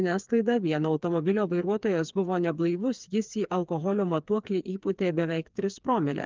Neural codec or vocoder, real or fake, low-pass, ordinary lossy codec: codec, 16 kHz, 4 kbps, FreqCodec, smaller model; fake; 7.2 kHz; Opus, 24 kbps